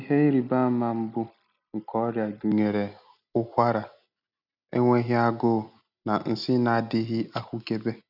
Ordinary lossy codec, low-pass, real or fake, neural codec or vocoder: none; 5.4 kHz; real; none